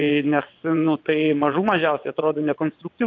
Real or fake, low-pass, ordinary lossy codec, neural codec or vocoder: fake; 7.2 kHz; AAC, 48 kbps; vocoder, 22.05 kHz, 80 mel bands, WaveNeXt